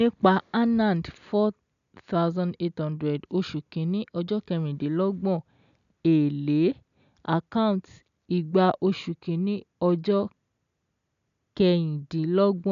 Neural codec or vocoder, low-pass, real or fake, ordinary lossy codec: none; 7.2 kHz; real; none